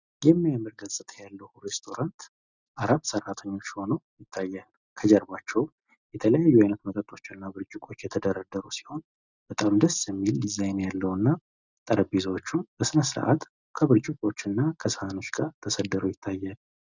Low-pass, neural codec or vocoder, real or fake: 7.2 kHz; none; real